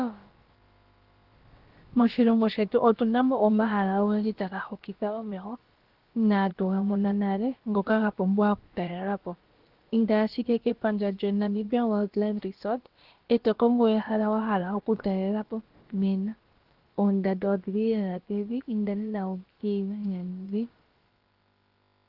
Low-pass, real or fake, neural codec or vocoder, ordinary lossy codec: 5.4 kHz; fake; codec, 16 kHz, about 1 kbps, DyCAST, with the encoder's durations; Opus, 16 kbps